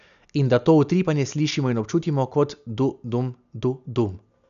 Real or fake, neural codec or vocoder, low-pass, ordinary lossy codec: real; none; 7.2 kHz; none